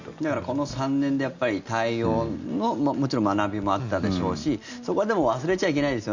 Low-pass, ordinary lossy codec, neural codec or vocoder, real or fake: 7.2 kHz; Opus, 64 kbps; none; real